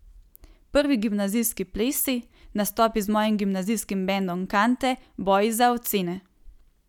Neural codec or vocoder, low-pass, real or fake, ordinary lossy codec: none; 19.8 kHz; real; none